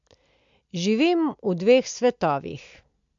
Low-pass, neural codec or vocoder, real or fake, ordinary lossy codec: 7.2 kHz; none; real; MP3, 64 kbps